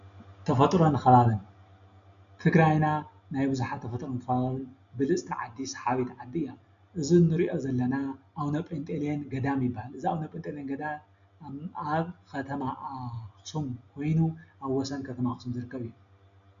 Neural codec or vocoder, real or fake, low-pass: none; real; 7.2 kHz